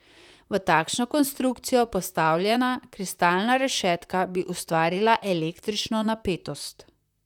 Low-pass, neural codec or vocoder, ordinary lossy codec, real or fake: 19.8 kHz; vocoder, 44.1 kHz, 128 mel bands, Pupu-Vocoder; none; fake